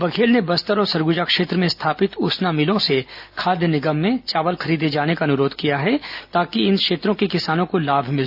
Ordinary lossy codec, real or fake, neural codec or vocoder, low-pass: AAC, 48 kbps; real; none; 5.4 kHz